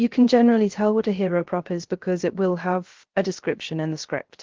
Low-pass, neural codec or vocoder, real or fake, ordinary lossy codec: 7.2 kHz; codec, 16 kHz, 0.3 kbps, FocalCodec; fake; Opus, 16 kbps